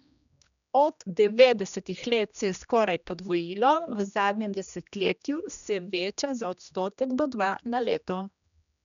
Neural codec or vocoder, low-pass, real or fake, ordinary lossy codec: codec, 16 kHz, 1 kbps, X-Codec, HuBERT features, trained on general audio; 7.2 kHz; fake; none